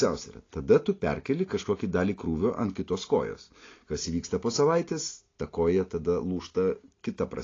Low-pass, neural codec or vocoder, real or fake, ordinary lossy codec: 7.2 kHz; none; real; AAC, 32 kbps